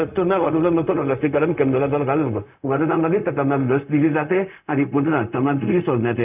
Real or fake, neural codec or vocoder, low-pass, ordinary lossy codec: fake; codec, 16 kHz, 0.4 kbps, LongCat-Audio-Codec; 3.6 kHz; none